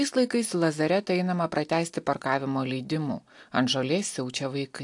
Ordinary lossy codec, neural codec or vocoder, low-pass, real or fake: AAC, 64 kbps; none; 10.8 kHz; real